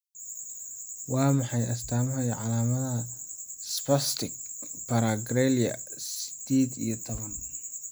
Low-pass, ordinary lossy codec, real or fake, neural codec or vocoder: none; none; real; none